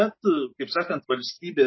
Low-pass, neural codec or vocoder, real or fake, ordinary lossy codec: 7.2 kHz; none; real; MP3, 24 kbps